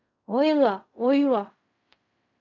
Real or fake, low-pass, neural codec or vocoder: fake; 7.2 kHz; codec, 16 kHz in and 24 kHz out, 0.4 kbps, LongCat-Audio-Codec, fine tuned four codebook decoder